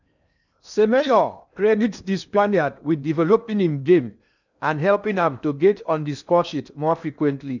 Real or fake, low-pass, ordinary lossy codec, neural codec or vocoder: fake; 7.2 kHz; none; codec, 16 kHz in and 24 kHz out, 0.8 kbps, FocalCodec, streaming, 65536 codes